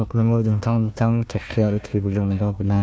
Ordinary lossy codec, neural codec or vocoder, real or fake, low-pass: none; codec, 16 kHz, 1 kbps, FunCodec, trained on Chinese and English, 50 frames a second; fake; none